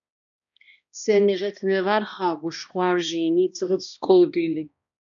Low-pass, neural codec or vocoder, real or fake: 7.2 kHz; codec, 16 kHz, 1 kbps, X-Codec, HuBERT features, trained on balanced general audio; fake